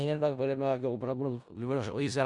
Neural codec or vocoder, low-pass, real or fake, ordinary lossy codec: codec, 16 kHz in and 24 kHz out, 0.4 kbps, LongCat-Audio-Codec, four codebook decoder; 10.8 kHz; fake; Opus, 64 kbps